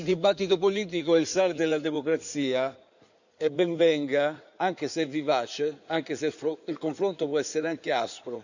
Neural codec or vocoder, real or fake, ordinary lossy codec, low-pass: codec, 16 kHz in and 24 kHz out, 2.2 kbps, FireRedTTS-2 codec; fake; none; 7.2 kHz